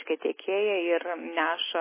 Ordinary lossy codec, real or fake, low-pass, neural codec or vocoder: MP3, 16 kbps; real; 3.6 kHz; none